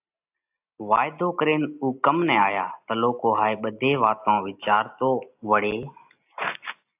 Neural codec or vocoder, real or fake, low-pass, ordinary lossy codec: none; real; 3.6 kHz; AAC, 32 kbps